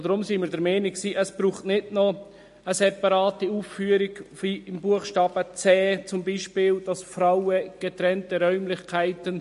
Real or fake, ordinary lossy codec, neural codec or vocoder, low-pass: real; MP3, 48 kbps; none; 14.4 kHz